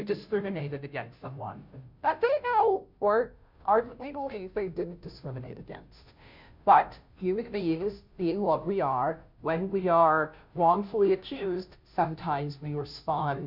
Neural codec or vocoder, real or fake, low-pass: codec, 16 kHz, 0.5 kbps, FunCodec, trained on Chinese and English, 25 frames a second; fake; 5.4 kHz